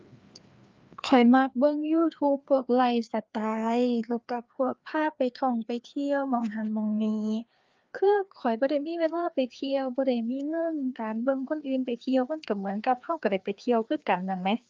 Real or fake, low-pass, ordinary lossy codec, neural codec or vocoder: fake; 7.2 kHz; Opus, 24 kbps; codec, 16 kHz, 2 kbps, FreqCodec, larger model